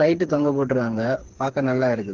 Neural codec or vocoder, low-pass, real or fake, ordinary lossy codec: codec, 16 kHz, 4 kbps, FreqCodec, smaller model; 7.2 kHz; fake; Opus, 16 kbps